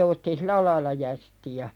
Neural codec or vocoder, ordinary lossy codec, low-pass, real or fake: none; none; 19.8 kHz; real